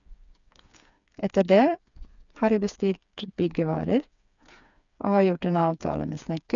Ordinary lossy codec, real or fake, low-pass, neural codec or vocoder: none; fake; 7.2 kHz; codec, 16 kHz, 4 kbps, FreqCodec, smaller model